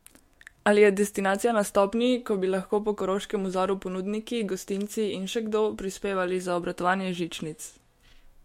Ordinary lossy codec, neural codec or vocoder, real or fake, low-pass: MP3, 64 kbps; autoencoder, 48 kHz, 128 numbers a frame, DAC-VAE, trained on Japanese speech; fake; 19.8 kHz